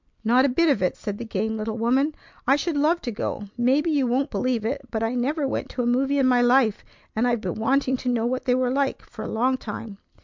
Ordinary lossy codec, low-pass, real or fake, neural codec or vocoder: MP3, 64 kbps; 7.2 kHz; real; none